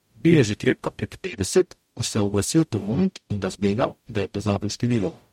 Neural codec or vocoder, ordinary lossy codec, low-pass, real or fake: codec, 44.1 kHz, 0.9 kbps, DAC; MP3, 64 kbps; 19.8 kHz; fake